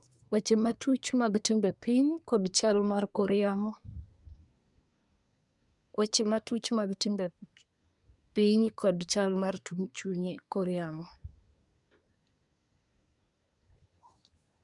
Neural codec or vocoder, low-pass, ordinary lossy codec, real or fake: codec, 24 kHz, 1 kbps, SNAC; 10.8 kHz; none; fake